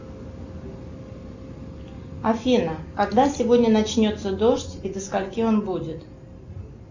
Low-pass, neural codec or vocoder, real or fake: 7.2 kHz; none; real